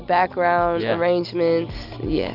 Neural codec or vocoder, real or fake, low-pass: none; real; 5.4 kHz